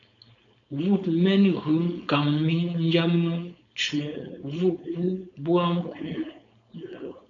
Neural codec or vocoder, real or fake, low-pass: codec, 16 kHz, 4.8 kbps, FACodec; fake; 7.2 kHz